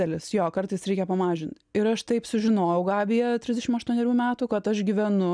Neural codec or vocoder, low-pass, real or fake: none; 9.9 kHz; real